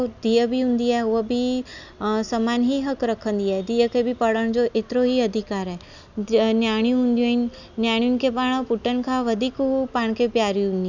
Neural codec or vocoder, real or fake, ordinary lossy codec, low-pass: none; real; none; 7.2 kHz